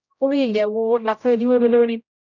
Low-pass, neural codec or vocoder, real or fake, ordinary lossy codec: 7.2 kHz; codec, 16 kHz, 0.5 kbps, X-Codec, HuBERT features, trained on general audio; fake; none